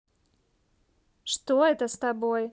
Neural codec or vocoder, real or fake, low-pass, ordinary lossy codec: none; real; none; none